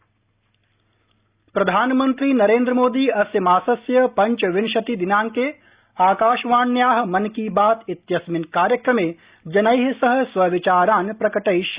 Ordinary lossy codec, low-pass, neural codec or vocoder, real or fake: Opus, 64 kbps; 3.6 kHz; none; real